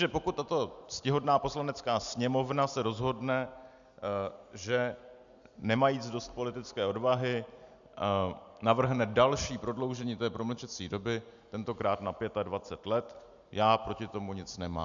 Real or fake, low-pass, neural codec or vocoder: real; 7.2 kHz; none